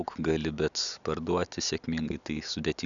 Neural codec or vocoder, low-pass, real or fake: none; 7.2 kHz; real